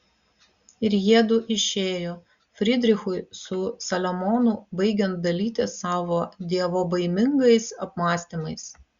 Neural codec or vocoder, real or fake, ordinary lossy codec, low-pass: none; real; Opus, 64 kbps; 7.2 kHz